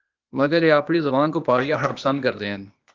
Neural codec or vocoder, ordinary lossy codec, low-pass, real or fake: codec, 16 kHz, 0.8 kbps, ZipCodec; Opus, 32 kbps; 7.2 kHz; fake